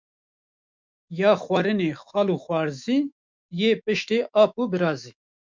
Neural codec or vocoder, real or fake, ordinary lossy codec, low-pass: autoencoder, 48 kHz, 128 numbers a frame, DAC-VAE, trained on Japanese speech; fake; MP3, 64 kbps; 7.2 kHz